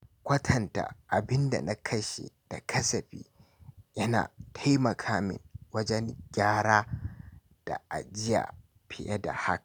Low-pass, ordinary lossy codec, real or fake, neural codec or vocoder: none; none; real; none